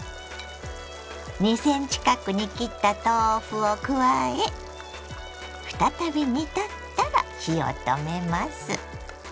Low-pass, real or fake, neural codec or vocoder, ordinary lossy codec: none; real; none; none